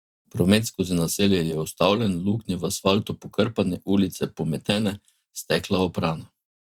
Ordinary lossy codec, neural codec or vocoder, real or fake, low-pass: Opus, 64 kbps; vocoder, 44.1 kHz, 128 mel bands every 512 samples, BigVGAN v2; fake; 19.8 kHz